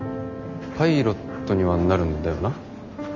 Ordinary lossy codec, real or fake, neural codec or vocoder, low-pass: none; real; none; 7.2 kHz